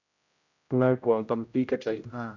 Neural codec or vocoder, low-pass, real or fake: codec, 16 kHz, 0.5 kbps, X-Codec, HuBERT features, trained on general audio; 7.2 kHz; fake